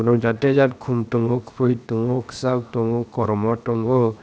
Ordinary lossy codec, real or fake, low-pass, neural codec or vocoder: none; fake; none; codec, 16 kHz, 0.7 kbps, FocalCodec